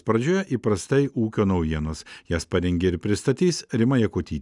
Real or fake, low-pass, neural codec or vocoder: real; 10.8 kHz; none